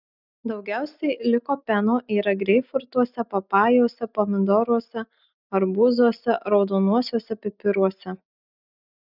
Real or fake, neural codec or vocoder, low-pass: real; none; 5.4 kHz